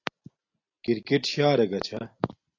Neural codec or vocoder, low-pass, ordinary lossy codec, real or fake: none; 7.2 kHz; AAC, 48 kbps; real